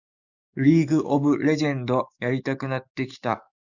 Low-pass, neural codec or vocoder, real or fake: 7.2 kHz; codec, 44.1 kHz, 7.8 kbps, DAC; fake